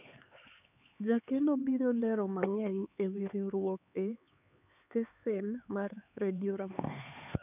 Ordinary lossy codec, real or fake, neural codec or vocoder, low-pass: none; fake; codec, 16 kHz, 4 kbps, X-Codec, HuBERT features, trained on LibriSpeech; 3.6 kHz